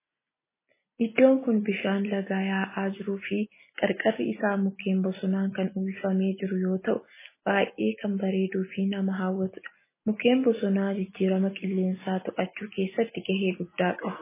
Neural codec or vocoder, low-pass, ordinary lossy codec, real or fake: none; 3.6 kHz; MP3, 16 kbps; real